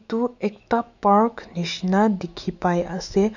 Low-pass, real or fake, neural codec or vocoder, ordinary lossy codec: 7.2 kHz; fake; autoencoder, 48 kHz, 128 numbers a frame, DAC-VAE, trained on Japanese speech; none